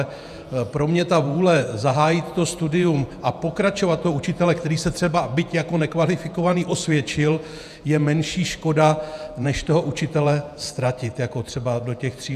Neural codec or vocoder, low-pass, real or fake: vocoder, 48 kHz, 128 mel bands, Vocos; 14.4 kHz; fake